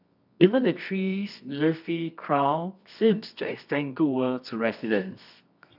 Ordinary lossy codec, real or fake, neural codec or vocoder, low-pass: none; fake; codec, 24 kHz, 0.9 kbps, WavTokenizer, medium music audio release; 5.4 kHz